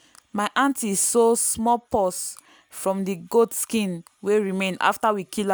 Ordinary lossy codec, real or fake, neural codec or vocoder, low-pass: none; real; none; none